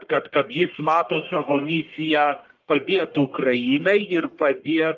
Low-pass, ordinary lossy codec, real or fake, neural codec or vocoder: 7.2 kHz; Opus, 24 kbps; fake; codec, 44.1 kHz, 1.7 kbps, Pupu-Codec